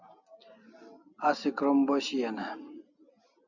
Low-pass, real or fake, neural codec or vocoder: 7.2 kHz; real; none